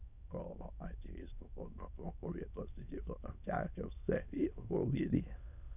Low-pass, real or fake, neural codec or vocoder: 3.6 kHz; fake; autoencoder, 22.05 kHz, a latent of 192 numbers a frame, VITS, trained on many speakers